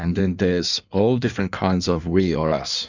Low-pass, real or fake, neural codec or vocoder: 7.2 kHz; fake; codec, 16 kHz in and 24 kHz out, 1.1 kbps, FireRedTTS-2 codec